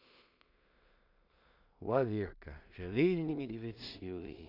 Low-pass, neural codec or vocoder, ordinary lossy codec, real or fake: 5.4 kHz; codec, 16 kHz in and 24 kHz out, 0.4 kbps, LongCat-Audio-Codec, two codebook decoder; none; fake